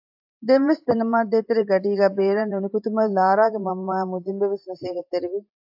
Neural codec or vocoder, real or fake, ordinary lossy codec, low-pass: vocoder, 44.1 kHz, 128 mel bands every 512 samples, BigVGAN v2; fake; AAC, 48 kbps; 5.4 kHz